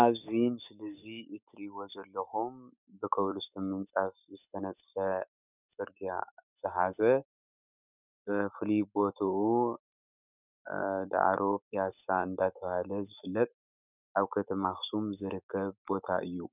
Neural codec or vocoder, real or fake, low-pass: autoencoder, 48 kHz, 128 numbers a frame, DAC-VAE, trained on Japanese speech; fake; 3.6 kHz